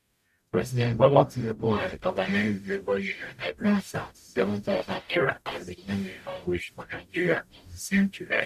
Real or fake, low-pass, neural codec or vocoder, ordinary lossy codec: fake; 14.4 kHz; codec, 44.1 kHz, 0.9 kbps, DAC; MP3, 96 kbps